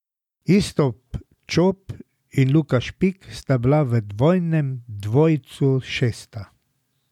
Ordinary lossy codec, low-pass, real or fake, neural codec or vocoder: none; 19.8 kHz; real; none